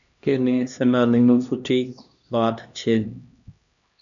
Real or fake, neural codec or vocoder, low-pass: fake; codec, 16 kHz, 1 kbps, X-Codec, HuBERT features, trained on LibriSpeech; 7.2 kHz